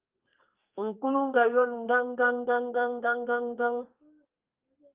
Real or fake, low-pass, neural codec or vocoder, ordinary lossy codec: fake; 3.6 kHz; codec, 32 kHz, 1.9 kbps, SNAC; Opus, 24 kbps